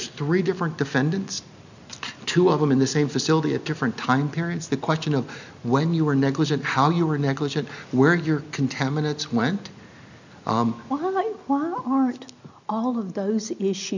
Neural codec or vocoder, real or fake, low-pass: none; real; 7.2 kHz